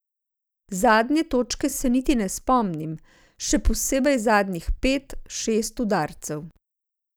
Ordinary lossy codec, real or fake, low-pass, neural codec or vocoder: none; real; none; none